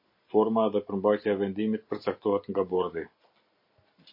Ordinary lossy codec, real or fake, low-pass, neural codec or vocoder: MP3, 32 kbps; real; 5.4 kHz; none